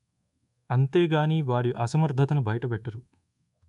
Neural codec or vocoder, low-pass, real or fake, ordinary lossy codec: codec, 24 kHz, 1.2 kbps, DualCodec; 10.8 kHz; fake; none